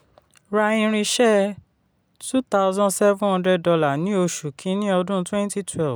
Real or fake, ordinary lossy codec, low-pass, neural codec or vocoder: real; none; none; none